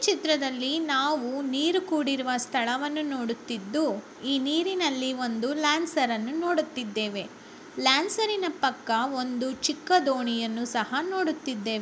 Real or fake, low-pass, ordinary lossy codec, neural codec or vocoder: real; none; none; none